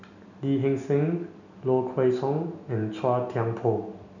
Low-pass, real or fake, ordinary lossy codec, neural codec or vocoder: 7.2 kHz; real; none; none